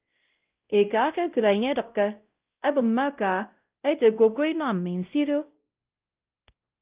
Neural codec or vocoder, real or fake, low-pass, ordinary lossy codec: codec, 16 kHz, 0.5 kbps, X-Codec, WavLM features, trained on Multilingual LibriSpeech; fake; 3.6 kHz; Opus, 24 kbps